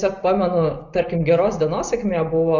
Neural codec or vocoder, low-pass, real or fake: none; 7.2 kHz; real